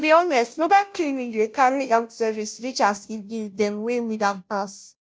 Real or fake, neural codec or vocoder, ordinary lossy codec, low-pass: fake; codec, 16 kHz, 0.5 kbps, FunCodec, trained on Chinese and English, 25 frames a second; none; none